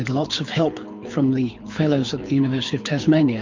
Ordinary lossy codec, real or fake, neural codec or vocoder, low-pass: MP3, 64 kbps; fake; codec, 24 kHz, 6 kbps, HILCodec; 7.2 kHz